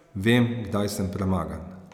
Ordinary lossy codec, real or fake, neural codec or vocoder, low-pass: none; real; none; 19.8 kHz